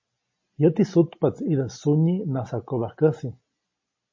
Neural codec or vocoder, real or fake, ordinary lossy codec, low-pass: none; real; MP3, 32 kbps; 7.2 kHz